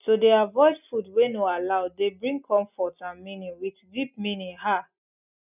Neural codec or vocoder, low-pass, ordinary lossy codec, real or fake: none; 3.6 kHz; none; real